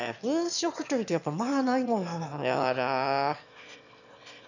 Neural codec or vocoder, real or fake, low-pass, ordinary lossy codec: autoencoder, 22.05 kHz, a latent of 192 numbers a frame, VITS, trained on one speaker; fake; 7.2 kHz; none